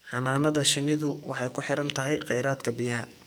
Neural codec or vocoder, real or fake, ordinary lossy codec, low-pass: codec, 44.1 kHz, 2.6 kbps, SNAC; fake; none; none